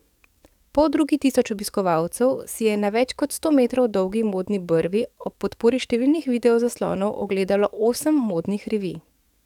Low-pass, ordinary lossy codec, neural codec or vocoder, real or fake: 19.8 kHz; none; codec, 44.1 kHz, 7.8 kbps, DAC; fake